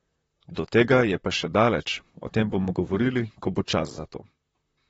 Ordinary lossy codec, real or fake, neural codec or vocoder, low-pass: AAC, 24 kbps; fake; vocoder, 44.1 kHz, 128 mel bands, Pupu-Vocoder; 19.8 kHz